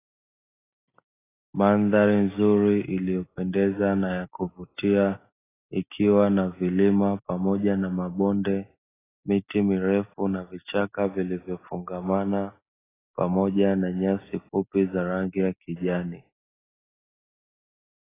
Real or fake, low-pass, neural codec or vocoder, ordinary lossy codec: real; 3.6 kHz; none; AAC, 16 kbps